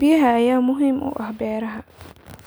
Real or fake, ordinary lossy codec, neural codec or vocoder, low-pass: real; none; none; none